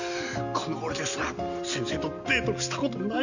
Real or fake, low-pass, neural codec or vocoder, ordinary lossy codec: real; 7.2 kHz; none; none